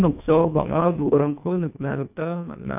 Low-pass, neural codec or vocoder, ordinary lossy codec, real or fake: 3.6 kHz; codec, 24 kHz, 1.5 kbps, HILCodec; none; fake